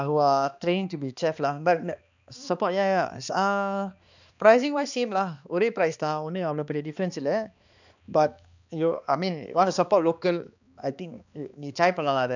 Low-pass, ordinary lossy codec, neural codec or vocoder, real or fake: 7.2 kHz; none; codec, 16 kHz, 2 kbps, X-Codec, HuBERT features, trained on balanced general audio; fake